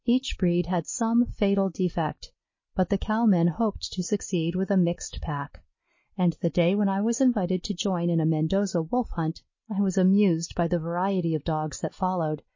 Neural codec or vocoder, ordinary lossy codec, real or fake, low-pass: none; MP3, 32 kbps; real; 7.2 kHz